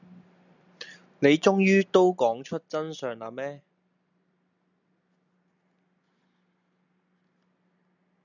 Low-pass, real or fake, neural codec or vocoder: 7.2 kHz; real; none